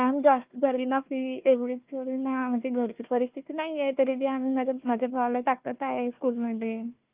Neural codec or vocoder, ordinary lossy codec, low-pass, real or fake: codec, 16 kHz, 1 kbps, FunCodec, trained on Chinese and English, 50 frames a second; Opus, 32 kbps; 3.6 kHz; fake